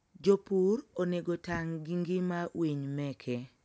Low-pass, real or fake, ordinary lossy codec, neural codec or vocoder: none; real; none; none